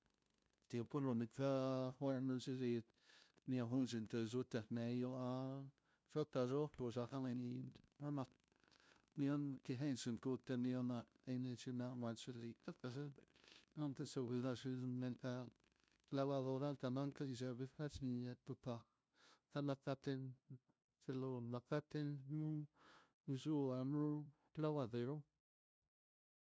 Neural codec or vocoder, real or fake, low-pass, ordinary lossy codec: codec, 16 kHz, 0.5 kbps, FunCodec, trained on LibriTTS, 25 frames a second; fake; none; none